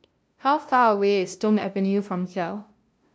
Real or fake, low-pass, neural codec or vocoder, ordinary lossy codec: fake; none; codec, 16 kHz, 0.5 kbps, FunCodec, trained on LibriTTS, 25 frames a second; none